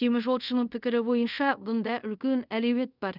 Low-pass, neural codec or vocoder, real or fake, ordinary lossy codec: 5.4 kHz; codec, 24 kHz, 0.5 kbps, DualCodec; fake; none